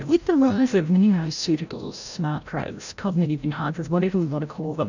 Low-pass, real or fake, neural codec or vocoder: 7.2 kHz; fake; codec, 16 kHz, 0.5 kbps, FreqCodec, larger model